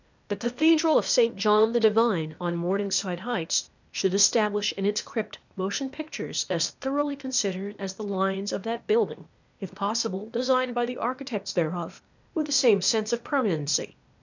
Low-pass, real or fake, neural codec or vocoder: 7.2 kHz; fake; codec, 16 kHz, 0.8 kbps, ZipCodec